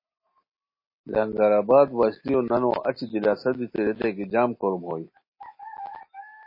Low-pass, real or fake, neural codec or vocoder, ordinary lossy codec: 5.4 kHz; real; none; MP3, 24 kbps